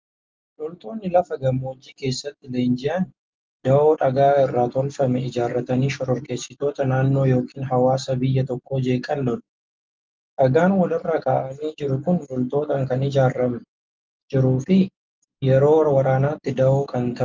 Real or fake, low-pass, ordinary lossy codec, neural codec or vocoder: real; 7.2 kHz; Opus, 24 kbps; none